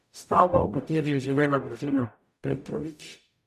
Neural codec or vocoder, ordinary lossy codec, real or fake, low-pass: codec, 44.1 kHz, 0.9 kbps, DAC; none; fake; 14.4 kHz